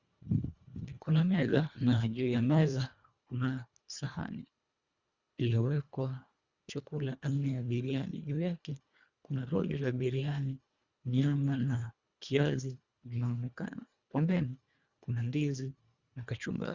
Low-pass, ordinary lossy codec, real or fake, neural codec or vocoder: 7.2 kHz; Opus, 64 kbps; fake; codec, 24 kHz, 1.5 kbps, HILCodec